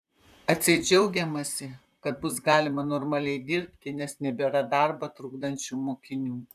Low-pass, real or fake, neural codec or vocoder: 14.4 kHz; fake; vocoder, 44.1 kHz, 128 mel bands, Pupu-Vocoder